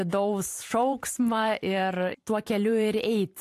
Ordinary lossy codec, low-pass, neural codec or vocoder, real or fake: AAC, 64 kbps; 14.4 kHz; vocoder, 44.1 kHz, 128 mel bands every 512 samples, BigVGAN v2; fake